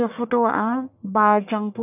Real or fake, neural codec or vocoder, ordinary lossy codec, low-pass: fake; codec, 44.1 kHz, 1.7 kbps, Pupu-Codec; none; 3.6 kHz